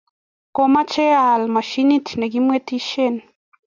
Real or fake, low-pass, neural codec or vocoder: real; 7.2 kHz; none